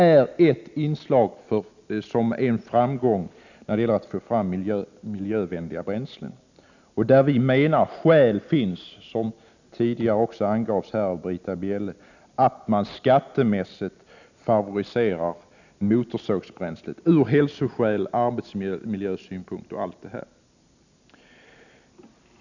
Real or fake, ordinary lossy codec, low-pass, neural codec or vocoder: real; none; 7.2 kHz; none